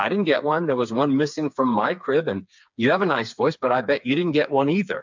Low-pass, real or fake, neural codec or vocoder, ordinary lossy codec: 7.2 kHz; fake; codec, 16 kHz, 4 kbps, FreqCodec, smaller model; MP3, 64 kbps